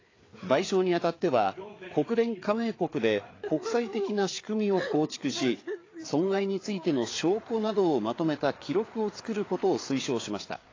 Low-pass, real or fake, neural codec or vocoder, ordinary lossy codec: 7.2 kHz; fake; codec, 16 kHz, 4 kbps, FreqCodec, larger model; AAC, 32 kbps